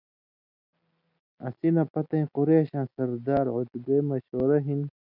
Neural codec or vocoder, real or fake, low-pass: none; real; 5.4 kHz